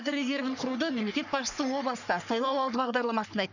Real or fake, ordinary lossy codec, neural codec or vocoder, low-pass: fake; none; codec, 44.1 kHz, 3.4 kbps, Pupu-Codec; 7.2 kHz